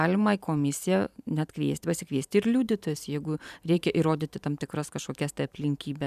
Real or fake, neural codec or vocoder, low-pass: fake; vocoder, 44.1 kHz, 128 mel bands every 512 samples, BigVGAN v2; 14.4 kHz